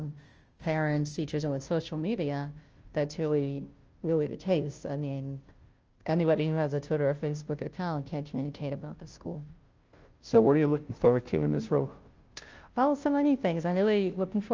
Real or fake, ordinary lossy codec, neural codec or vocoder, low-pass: fake; Opus, 24 kbps; codec, 16 kHz, 0.5 kbps, FunCodec, trained on Chinese and English, 25 frames a second; 7.2 kHz